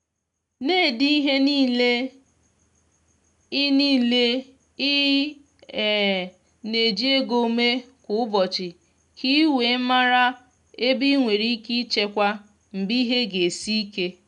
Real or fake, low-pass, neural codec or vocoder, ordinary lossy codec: real; 10.8 kHz; none; none